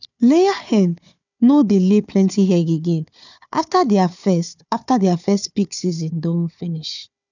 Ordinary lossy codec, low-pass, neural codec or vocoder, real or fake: none; 7.2 kHz; codec, 16 kHz, 4 kbps, FunCodec, trained on Chinese and English, 50 frames a second; fake